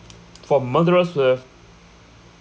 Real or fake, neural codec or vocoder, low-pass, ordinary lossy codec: real; none; none; none